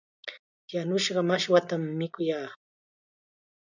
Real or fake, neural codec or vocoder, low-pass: real; none; 7.2 kHz